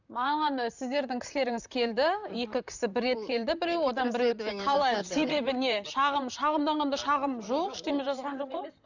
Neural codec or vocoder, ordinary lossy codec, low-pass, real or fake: vocoder, 44.1 kHz, 128 mel bands, Pupu-Vocoder; none; 7.2 kHz; fake